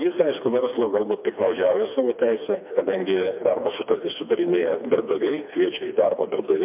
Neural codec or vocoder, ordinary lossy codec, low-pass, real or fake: codec, 16 kHz, 2 kbps, FreqCodec, smaller model; MP3, 32 kbps; 3.6 kHz; fake